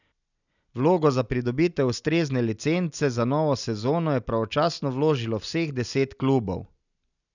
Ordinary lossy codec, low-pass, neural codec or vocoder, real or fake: none; 7.2 kHz; none; real